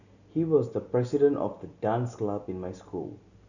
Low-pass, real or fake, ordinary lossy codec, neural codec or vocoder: 7.2 kHz; real; none; none